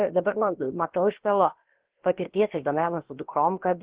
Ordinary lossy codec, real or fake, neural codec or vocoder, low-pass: Opus, 16 kbps; fake; codec, 16 kHz, about 1 kbps, DyCAST, with the encoder's durations; 3.6 kHz